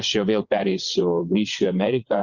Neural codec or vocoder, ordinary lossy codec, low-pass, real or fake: vocoder, 22.05 kHz, 80 mel bands, WaveNeXt; Opus, 64 kbps; 7.2 kHz; fake